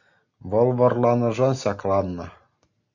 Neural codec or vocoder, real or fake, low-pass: none; real; 7.2 kHz